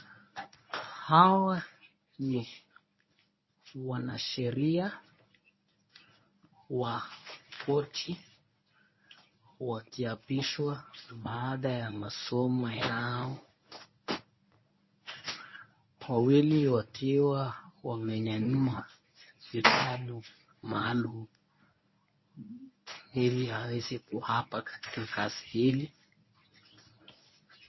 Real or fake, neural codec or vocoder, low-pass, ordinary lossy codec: fake; codec, 24 kHz, 0.9 kbps, WavTokenizer, medium speech release version 1; 7.2 kHz; MP3, 24 kbps